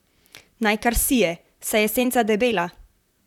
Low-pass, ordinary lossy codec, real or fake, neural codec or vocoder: 19.8 kHz; none; fake; vocoder, 44.1 kHz, 128 mel bands, Pupu-Vocoder